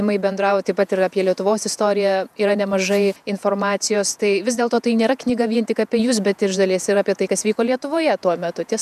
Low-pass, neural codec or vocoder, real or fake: 14.4 kHz; vocoder, 48 kHz, 128 mel bands, Vocos; fake